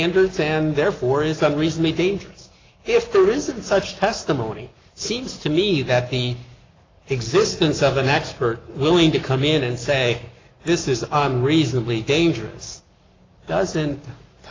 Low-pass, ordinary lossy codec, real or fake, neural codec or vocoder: 7.2 kHz; AAC, 32 kbps; fake; codec, 44.1 kHz, 7.8 kbps, Pupu-Codec